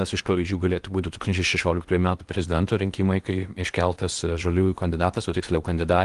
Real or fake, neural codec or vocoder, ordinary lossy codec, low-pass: fake; codec, 16 kHz in and 24 kHz out, 0.8 kbps, FocalCodec, streaming, 65536 codes; Opus, 24 kbps; 10.8 kHz